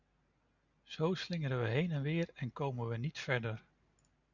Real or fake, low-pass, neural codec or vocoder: real; 7.2 kHz; none